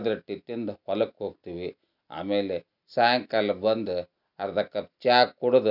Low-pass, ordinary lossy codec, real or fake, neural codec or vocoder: 5.4 kHz; none; real; none